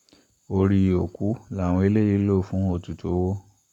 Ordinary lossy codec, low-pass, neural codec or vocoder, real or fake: none; 19.8 kHz; codec, 44.1 kHz, 7.8 kbps, Pupu-Codec; fake